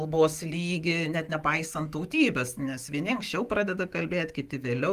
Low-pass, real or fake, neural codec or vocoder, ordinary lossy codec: 14.4 kHz; fake; autoencoder, 48 kHz, 128 numbers a frame, DAC-VAE, trained on Japanese speech; Opus, 24 kbps